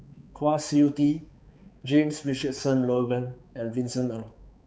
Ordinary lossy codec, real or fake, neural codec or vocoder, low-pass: none; fake; codec, 16 kHz, 4 kbps, X-Codec, HuBERT features, trained on balanced general audio; none